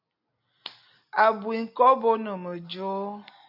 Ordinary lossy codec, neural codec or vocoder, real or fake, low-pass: AAC, 48 kbps; none; real; 5.4 kHz